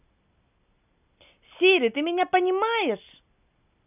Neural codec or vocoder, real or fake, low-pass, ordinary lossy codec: none; real; 3.6 kHz; none